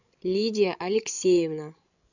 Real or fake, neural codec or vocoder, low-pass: fake; codec, 16 kHz, 16 kbps, FreqCodec, larger model; 7.2 kHz